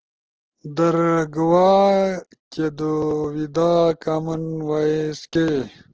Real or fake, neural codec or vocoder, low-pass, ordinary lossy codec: real; none; 7.2 kHz; Opus, 16 kbps